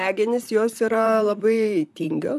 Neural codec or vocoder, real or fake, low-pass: vocoder, 44.1 kHz, 128 mel bands, Pupu-Vocoder; fake; 14.4 kHz